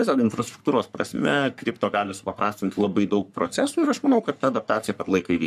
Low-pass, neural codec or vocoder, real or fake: 14.4 kHz; codec, 44.1 kHz, 3.4 kbps, Pupu-Codec; fake